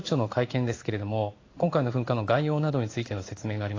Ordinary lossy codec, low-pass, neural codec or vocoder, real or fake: AAC, 32 kbps; 7.2 kHz; codec, 16 kHz in and 24 kHz out, 1 kbps, XY-Tokenizer; fake